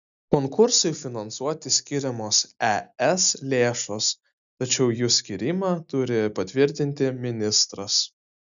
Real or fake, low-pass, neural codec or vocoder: real; 7.2 kHz; none